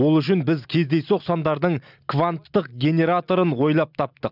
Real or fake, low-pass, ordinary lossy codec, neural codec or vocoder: real; 5.4 kHz; none; none